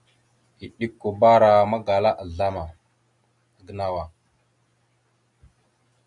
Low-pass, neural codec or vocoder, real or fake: 10.8 kHz; none; real